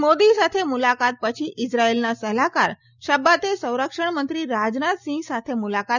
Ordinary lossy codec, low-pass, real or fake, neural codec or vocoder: none; 7.2 kHz; real; none